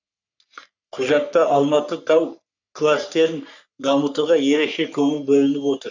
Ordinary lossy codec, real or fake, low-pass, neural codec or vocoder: none; fake; 7.2 kHz; codec, 44.1 kHz, 3.4 kbps, Pupu-Codec